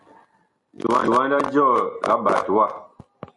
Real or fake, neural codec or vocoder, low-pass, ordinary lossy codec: real; none; 10.8 kHz; MP3, 48 kbps